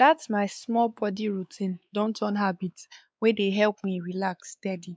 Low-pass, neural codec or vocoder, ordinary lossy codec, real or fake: none; codec, 16 kHz, 4 kbps, X-Codec, WavLM features, trained on Multilingual LibriSpeech; none; fake